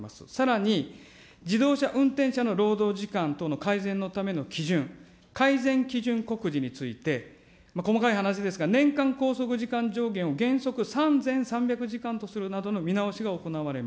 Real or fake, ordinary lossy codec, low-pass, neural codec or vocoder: real; none; none; none